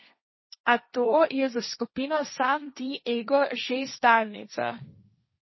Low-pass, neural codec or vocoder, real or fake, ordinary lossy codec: 7.2 kHz; codec, 16 kHz, 1.1 kbps, Voila-Tokenizer; fake; MP3, 24 kbps